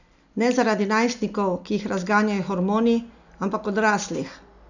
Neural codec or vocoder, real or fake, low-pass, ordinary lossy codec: none; real; 7.2 kHz; none